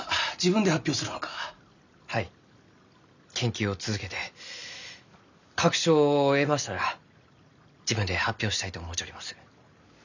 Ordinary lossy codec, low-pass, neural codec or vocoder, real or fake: none; 7.2 kHz; none; real